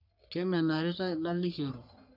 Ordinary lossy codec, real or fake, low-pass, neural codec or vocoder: none; fake; 5.4 kHz; codec, 44.1 kHz, 3.4 kbps, Pupu-Codec